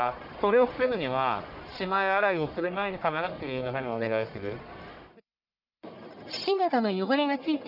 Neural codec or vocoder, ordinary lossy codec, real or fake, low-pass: codec, 44.1 kHz, 1.7 kbps, Pupu-Codec; none; fake; 5.4 kHz